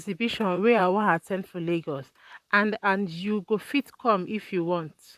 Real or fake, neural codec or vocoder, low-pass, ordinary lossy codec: fake; vocoder, 44.1 kHz, 128 mel bands, Pupu-Vocoder; 14.4 kHz; none